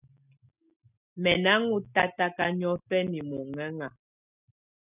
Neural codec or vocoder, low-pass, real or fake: none; 3.6 kHz; real